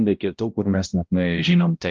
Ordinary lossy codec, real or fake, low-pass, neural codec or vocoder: Opus, 32 kbps; fake; 7.2 kHz; codec, 16 kHz, 0.5 kbps, X-Codec, HuBERT features, trained on balanced general audio